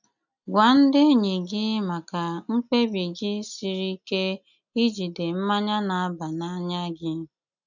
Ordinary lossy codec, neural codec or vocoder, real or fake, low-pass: none; none; real; 7.2 kHz